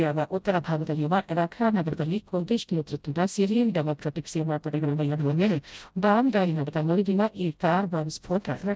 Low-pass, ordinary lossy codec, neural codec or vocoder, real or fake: none; none; codec, 16 kHz, 0.5 kbps, FreqCodec, smaller model; fake